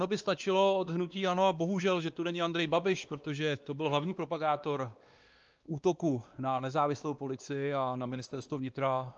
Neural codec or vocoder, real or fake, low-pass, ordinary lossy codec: codec, 16 kHz, 2 kbps, X-Codec, WavLM features, trained on Multilingual LibriSpeech; fake; 7.2 kHz; Opus, 24 kbps